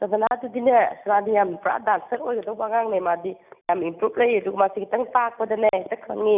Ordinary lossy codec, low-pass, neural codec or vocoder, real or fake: none; 3.6 kHz; none; real